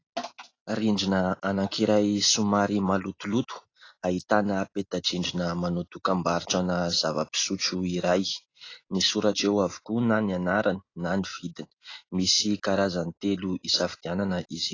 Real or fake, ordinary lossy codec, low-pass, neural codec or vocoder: real; AAC, 32 kbps; 7.2 kHz; none